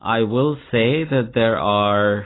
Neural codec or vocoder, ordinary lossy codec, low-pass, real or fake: none; AAC, 16 kbps; 7.2 kHz; real